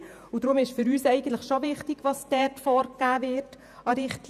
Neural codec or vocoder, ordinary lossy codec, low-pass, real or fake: vocoder, 48 kHz, 128 mel bands, Vocos; none; 14.4 kHz; fake